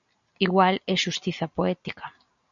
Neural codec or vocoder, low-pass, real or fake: none; 7.2 kHz; real